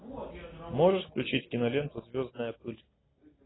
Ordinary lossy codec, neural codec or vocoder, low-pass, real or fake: AAC, 16 kbps; none; 7.2 kHz; real